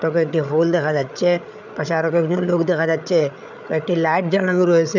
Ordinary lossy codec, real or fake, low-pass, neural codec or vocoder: none; fake; 7.2 kHz; codec, 16 kHz, 8 kbps, FreqCodec, larger model